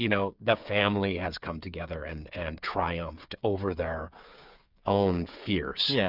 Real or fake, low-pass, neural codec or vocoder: fake; 5.4 kHz; codec, 16 kHz, 8 kbps, FreqCodec, smaller model